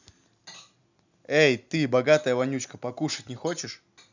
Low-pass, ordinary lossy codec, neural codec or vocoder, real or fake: 7.2 kHz; none; none; real